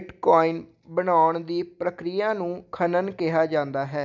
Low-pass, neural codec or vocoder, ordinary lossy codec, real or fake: 7.2 kHz; none; none; real